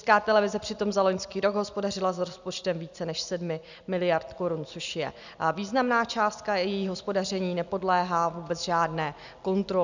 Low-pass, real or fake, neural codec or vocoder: 7.2 kHz; real; none